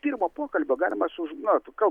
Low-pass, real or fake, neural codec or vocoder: 19.8 kHz; real; none